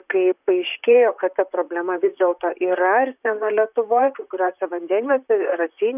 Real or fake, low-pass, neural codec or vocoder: fake; 3.6 kHz; vocoder, 44.1 kHz, 128 mel bands, Pupu-Vocoder